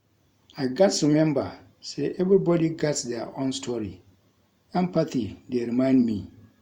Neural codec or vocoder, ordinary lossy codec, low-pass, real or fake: vocoder, 44.1 kHz, 128 mel bands every 512 samples, BigVGAN v2; Opus, 64 kbps; 19.8 kHz; fake